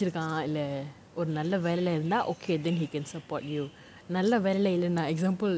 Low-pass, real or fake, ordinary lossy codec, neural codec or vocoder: none; real; none; none